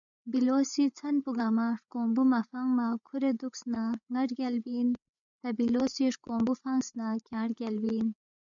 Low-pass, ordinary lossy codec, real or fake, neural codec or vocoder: 7.2 kHz; MP3, 96 kbps; fake; codec, 16 kHz, 8 kbps, FreqCodec, larger model